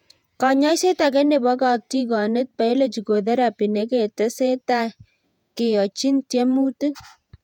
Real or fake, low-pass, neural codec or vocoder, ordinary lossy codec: fake; 19.8 kHz; vocoder, 48 kHz, 128 mel bands, Vocos; none